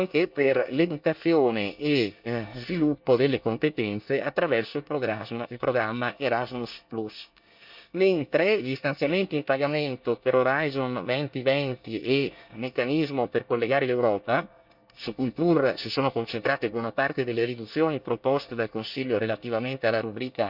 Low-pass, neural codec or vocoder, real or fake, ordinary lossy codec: 5.4 kHz; codec, 24 kHz, 1 kbps, SNAC; fake; none